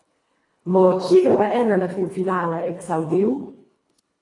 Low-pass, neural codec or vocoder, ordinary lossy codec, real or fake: 10.8 kHz; codec, 24 kHz, 1.5 kbps, HILCodec; AAC, 32 kbps; fake